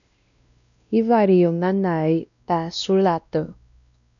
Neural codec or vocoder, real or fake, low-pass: codec, 16 kHz, 1 kbps, X-Codec, WavLM features, trained on Multilingual LibriSpeech; fake; 7.2 kHz